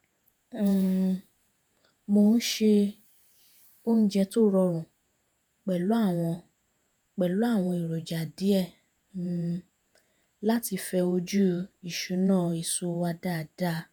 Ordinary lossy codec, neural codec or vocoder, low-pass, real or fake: none; vocoder, 48 kHz, 128 mel bands, Vocos; none; fake